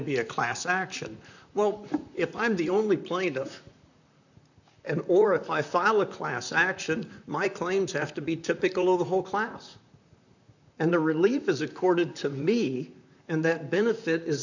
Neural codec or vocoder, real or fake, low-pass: vocoder, 44.1 kHz, 128 mel bands, Pupu-Vocoder; fake; 7.2 kHz